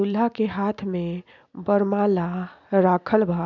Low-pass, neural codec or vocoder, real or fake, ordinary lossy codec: 7.2 kHz; none; real; none